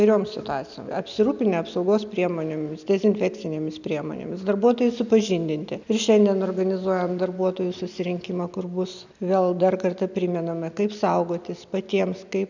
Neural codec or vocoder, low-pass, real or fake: none; 7.2 kHz; real